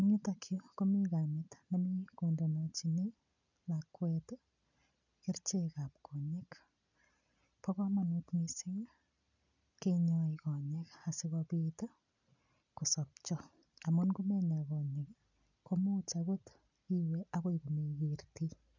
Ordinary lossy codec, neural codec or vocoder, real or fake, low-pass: none; none; real; 7.2 kHz